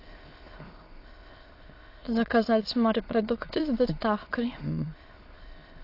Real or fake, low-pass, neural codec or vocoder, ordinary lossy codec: fake; 5.4 kHz; autoencoder, 22.05 kHz, a latent of 192 numbers a frame, VITS, trained on many speakers; AAC, 32 kbps